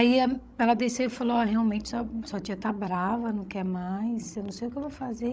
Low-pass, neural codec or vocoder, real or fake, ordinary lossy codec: none; codec, 16 kHz, 16 kbps, FreqCodec, larger model; fake; none